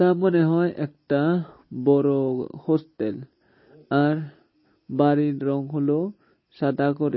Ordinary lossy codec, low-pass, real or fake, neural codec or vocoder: MP3, 24 kbps; 7.2 kHz; real; none